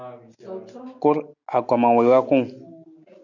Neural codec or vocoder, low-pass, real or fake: none; 7.2 kHz; real